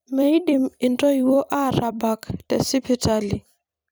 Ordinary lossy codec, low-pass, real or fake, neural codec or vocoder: none; none; fake; vocoder, 44.1 kHz, 128 mel bands every 256 samples, BigVGAN v2